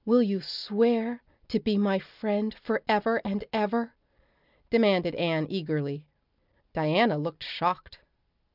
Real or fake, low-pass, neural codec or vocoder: real; 5.4 kHz; none